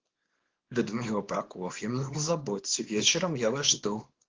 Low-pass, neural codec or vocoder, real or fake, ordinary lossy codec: 7.2 kHz; codec, 24 kHz, 0.9 kbps, WavTokenizer, small release; fake; Opus, 16 kbps